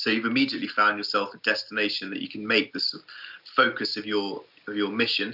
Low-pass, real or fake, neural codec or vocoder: 5.4 kHz; real; none